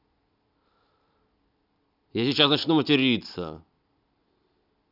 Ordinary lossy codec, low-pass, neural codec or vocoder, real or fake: none; 5.4 kHz; none; real